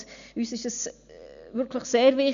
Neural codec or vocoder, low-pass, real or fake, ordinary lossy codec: none; 7.2 kHz; real; none